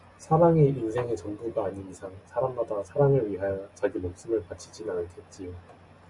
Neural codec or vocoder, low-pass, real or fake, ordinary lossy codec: none; 10.8 kHz; real; MP3, 96 kbps